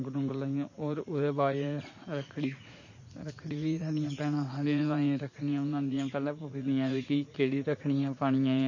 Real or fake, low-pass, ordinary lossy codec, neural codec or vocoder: fake; 7.2 kHz; MP3, 32 kbps; vocoder, 44.1 kHz, 80 mel bands, Vocos